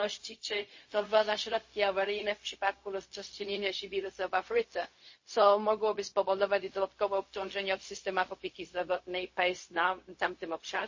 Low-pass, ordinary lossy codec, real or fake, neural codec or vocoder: 7.2 kHz; MP3, 32 kbps; fake; codec, 16 kHz, 0.4 kbps, LongCat-Audio-Codec